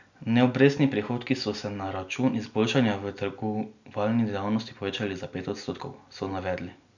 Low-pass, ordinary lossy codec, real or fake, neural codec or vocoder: 7.2 kHz; none; real; none